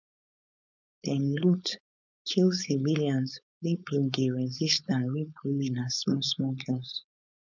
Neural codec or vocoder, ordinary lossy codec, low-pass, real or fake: codec, 16 kHz, 4.8 kbps, FACodec; none; 7.2 kHz; fake